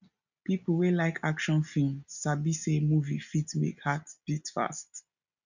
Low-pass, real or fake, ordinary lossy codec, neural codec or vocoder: 7.2 kHz; real; none; none